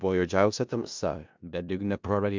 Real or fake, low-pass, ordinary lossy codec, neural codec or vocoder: fake; 7.2 kHz; MP3, 64 kbps; codec, 16 kHz in and 24 kHz out, 0.4 kbps, LongCat-Audio-Codec, four codebook decoder